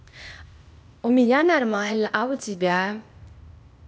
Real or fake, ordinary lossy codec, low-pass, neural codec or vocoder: fake; none; none; codec, 16 kHz, 0.8 kbps, ZipCodec